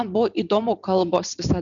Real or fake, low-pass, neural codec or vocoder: real; 7.2 kHz; none